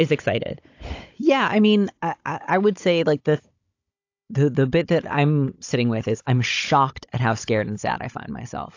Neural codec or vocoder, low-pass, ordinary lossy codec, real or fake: codec, 16 kHz, 16 kbps, FunCodec, trained on Chinese and English, 50 frames a second; 7.2 kHz; AAC, 48 kbps; fake